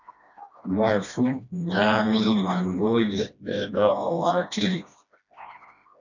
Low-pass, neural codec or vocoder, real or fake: 7.2 kHz; codec, 16 kHz, 1 kbps, FreqCodec, smaller model; fake